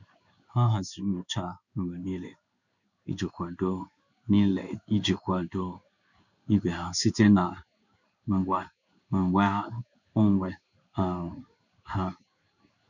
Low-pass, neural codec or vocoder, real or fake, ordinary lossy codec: 7.2 kHz; codec, 16 kHz in and 24 kHz out, 1 kbps, XY-Tokenizer; fake; none